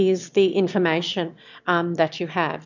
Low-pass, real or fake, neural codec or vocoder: 7.2 kHz; fake; autoencoder, 22.05 kHz, a latent of 192 numbers a frame, VITS, trained on one speaker